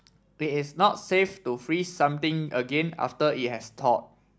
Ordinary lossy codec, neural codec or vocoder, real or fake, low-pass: none; none; real; none